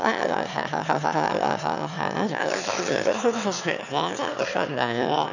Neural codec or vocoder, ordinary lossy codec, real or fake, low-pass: autoencoder, 22.05 kHz, a latent of 192 numbers a frame, VITS, trained on one speaker; none; fake; 7.2 kHz